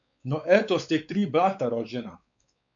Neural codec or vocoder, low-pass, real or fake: codec, 16 kHz, 4 kbps, X-Codec, WavLM features, trained on Multilingual LibriSpeech; 7.2 kHz; fake